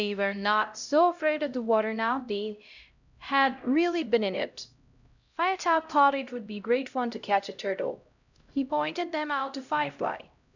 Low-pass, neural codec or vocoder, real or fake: 7.2 kHz; codec, 16 kHz, 0.5 kbps, X-Codec, HuBERT features, trained on LibriSpeech; fake